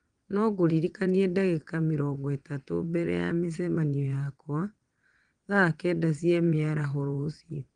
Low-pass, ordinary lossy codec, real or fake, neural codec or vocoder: 9.9 kHz; Opus, 24 kbps; fake; vocoder, 22.05 kHz, 80 mel bands, WaveNeXt